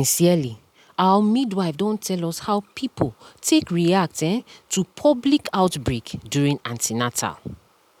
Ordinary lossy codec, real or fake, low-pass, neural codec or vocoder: none; real; 19.8 kHz; none